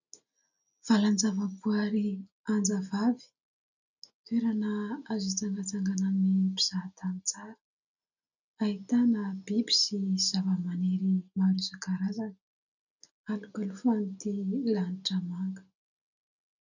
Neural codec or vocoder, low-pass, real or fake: none; 7.2 kHz; real